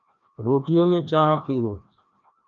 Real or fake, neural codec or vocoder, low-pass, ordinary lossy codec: fake; codec, 16 kHz, 1 kbps, FreqCodec, larger model; 7.2 kHz; Opus, 32 kbps